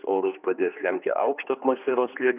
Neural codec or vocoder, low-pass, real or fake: codec, 16 kHz, 2 kbps, X-Codec, HuBERT features, trained on general audio; 3.6 kHz; fake